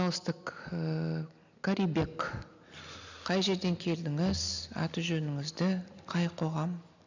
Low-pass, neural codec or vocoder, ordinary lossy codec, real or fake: 7.2 kHz; none; none; real